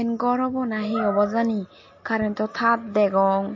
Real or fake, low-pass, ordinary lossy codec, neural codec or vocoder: real; 7.2 kHz; MP3, 32 kbps; none